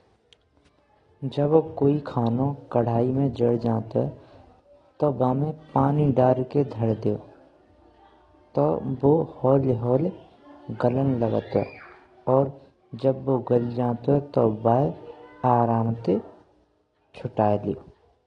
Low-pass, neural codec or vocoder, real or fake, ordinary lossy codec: 9.9 kHz; none; real; AAC, 32 kbps